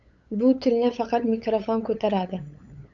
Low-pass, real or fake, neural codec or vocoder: 7.2 kHz; fake; codec, 16 kHz, 8 kbps, FunCodec, trained on LibriTTS, 25 frames a second